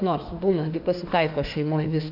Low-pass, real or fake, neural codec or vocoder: 5.4 kHz; fake; autoencoder, 48 kHz, 32 numbers a frame, DAC-VAE, trained on Japanese speech